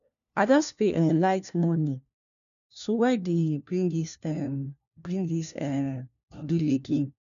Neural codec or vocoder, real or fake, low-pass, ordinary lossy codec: codec, 16 kHz, 1 kbps, FunCodec, trained on LibriTTS, 50 frames a second; fake; 7.2 kHz; none